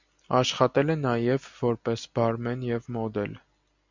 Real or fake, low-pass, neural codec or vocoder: real; 7.2 kHz; none